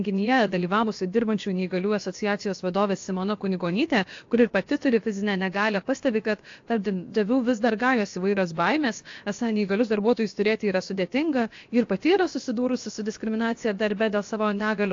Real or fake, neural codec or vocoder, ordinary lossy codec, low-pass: fake; codec, 16 kHz, about 1 kbps, DyCAST, with the encoder's durations; AAC, 48 kbps; 7.2 kHz